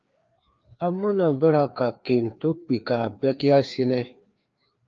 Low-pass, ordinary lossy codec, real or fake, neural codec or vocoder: 7.2 kHz; Opus, 24 kbps; fake; codec, 16 kHz, 2 kbps, FreqCodec, larger model